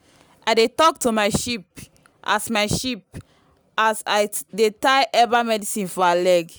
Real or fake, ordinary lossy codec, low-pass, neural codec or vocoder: real; none; none; none